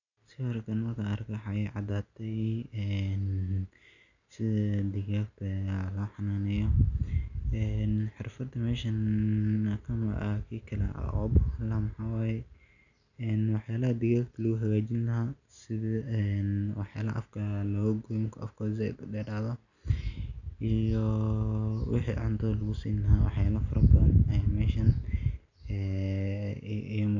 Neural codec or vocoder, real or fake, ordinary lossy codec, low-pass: none; real; none; 7.2 kHz